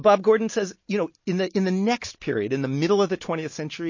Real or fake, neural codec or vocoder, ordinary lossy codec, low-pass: real; none; MP3, 32 kbps; 7.2 kHz